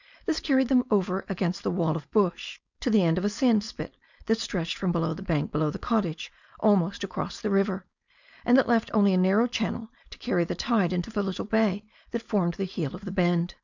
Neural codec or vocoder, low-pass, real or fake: codec, 16 kHz, 4.8 kbps, FACodec; 7.2 kHz; fake